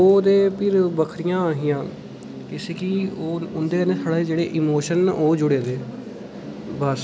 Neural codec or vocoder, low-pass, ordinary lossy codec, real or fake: none; none; none; real